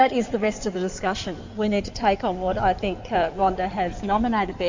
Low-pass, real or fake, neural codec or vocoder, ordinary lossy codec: 7.2 kHz; fake; codec, 16 kHz in and 24 kHz out, 2.2 kbps, FireRedTTS-2 codec; MP3, 64 kbps